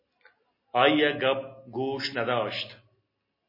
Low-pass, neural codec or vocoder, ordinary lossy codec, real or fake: 5.4 kHz; none; MP3, 24 kbps; real